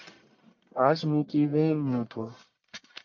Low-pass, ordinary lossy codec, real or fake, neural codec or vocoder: 7.2 kHz; MP3, 48 kbps; fake; codec, 44.1 kHz, 1.7 kbps, Pupu-Codec